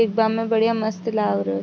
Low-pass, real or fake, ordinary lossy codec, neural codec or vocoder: none; real; none; none